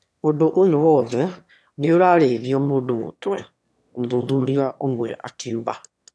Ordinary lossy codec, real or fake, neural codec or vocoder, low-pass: none; fake; autoencoder, 22.05 kHz, a latent of 192 numbers a frame, VITS, trained on one speaker; none